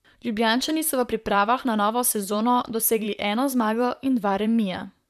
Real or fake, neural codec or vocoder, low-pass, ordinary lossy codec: fake; vocoder, 44.1 kHz, 128 mel bands, Pupu-Vocoder; 14.4 kHz; none